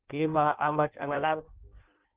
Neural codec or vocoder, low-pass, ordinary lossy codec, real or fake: codec, 16 kHz in and 24 kHz out, 0.6 kbps, FireRedTTS-2 codec; 3.6 kHz; Opus, 32 kbps; fake